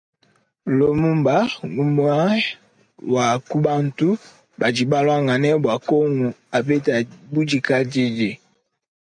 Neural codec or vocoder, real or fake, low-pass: none; real; 9.9 kHz